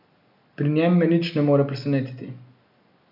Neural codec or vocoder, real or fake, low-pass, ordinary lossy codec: none; real; 5.4 kHz; none